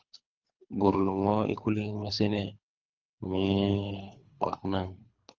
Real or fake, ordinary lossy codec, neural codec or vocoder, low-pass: fake; Opus, 24 kbps; codec, 24 kHz, 3 kbps, HILCodec; 7.2 kHz